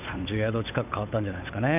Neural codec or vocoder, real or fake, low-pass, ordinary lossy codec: codec, 16 kHz, 8 kbps, FunCodec, trained on Chinese and English, 25 frames a second; fake; 3.6 kHz; none